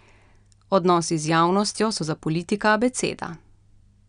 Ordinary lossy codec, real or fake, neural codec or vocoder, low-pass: none; real; none; 9.9 kHz